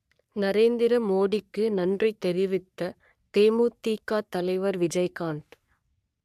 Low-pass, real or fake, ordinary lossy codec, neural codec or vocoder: 14.4 kHz; fake; AAC, 96 kbps; codec, 44.1 kHz, 3.4 kbps, Pupu-Codec